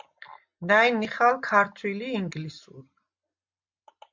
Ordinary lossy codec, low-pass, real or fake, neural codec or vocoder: MP3, 64 kbps; 7.2 kHz; real; none